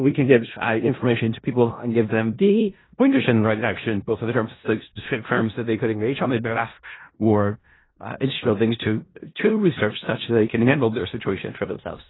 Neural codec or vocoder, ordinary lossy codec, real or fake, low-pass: codec, 16 kHz in and 24 kHz out, 0.4 kbps, LongCat-Audio-Codec, four codebook decoder; AAC, 16 kbps; fake; 7.2 kHz